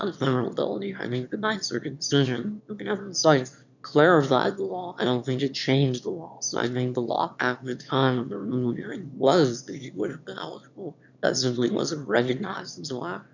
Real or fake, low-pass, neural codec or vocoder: fake; 7.2 kHz; autoencoder, 22.05 kHz, a latent of 192 numbers a frame, VITS, trained on one speaker